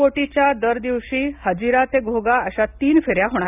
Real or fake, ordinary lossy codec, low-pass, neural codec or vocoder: real; none; 3.6 kHz; none